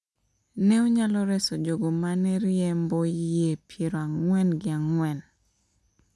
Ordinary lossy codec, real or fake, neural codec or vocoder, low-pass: none; real; none; none